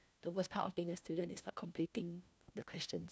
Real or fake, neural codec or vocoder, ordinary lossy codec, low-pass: fake; codec, 16 kHz, 1 kbps, FunCodec, trained on LibriTTS, 50 frames a second; none; none